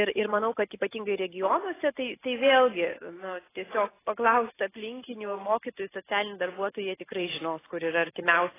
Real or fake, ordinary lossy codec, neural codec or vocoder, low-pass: real; AAC, 16 kbps; none; 3.6 kHz